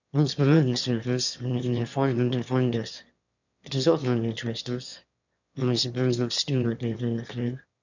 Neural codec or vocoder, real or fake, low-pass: autoencoder, 22.05 kHz, a latent of 192 numbers a frame, VITS, trained on one speaker; fake; 7.2 kHz